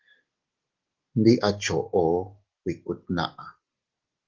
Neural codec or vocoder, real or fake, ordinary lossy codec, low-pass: none; real; Opus, 16 kbps; 7.2 kHz